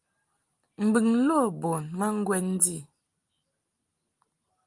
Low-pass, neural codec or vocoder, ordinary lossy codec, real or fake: 10.8 kHz; none; Opus, 32 kbps; real